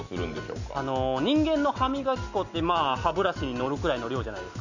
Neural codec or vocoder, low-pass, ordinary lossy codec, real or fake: none; 7.2 kHz; none; real